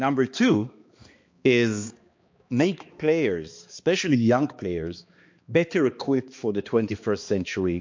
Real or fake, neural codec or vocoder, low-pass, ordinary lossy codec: fake; codec, 16 kHz, 2 kbps, X-Codec, HuBERT features, trained on balanced general audio; 7.2 kHz; MP3, 48 kbps